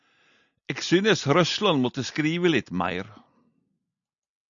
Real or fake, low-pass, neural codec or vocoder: real; 7.2 kHz; none